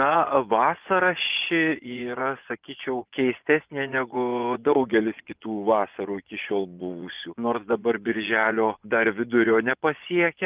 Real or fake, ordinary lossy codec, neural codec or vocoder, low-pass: fake; Opus, 24 kbps; vocoder, 24 kHz, 100 mel bands, Vocos; 3.6 kHz